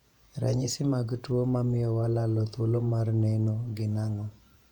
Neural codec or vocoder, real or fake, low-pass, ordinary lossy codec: vocoder, 48 kHz, 128 mel bands, Vocos; fake; 19.8 kHz; none